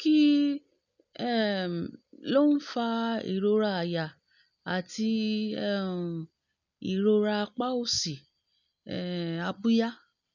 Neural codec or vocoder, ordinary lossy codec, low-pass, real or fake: none; none; 7.2 kHz; real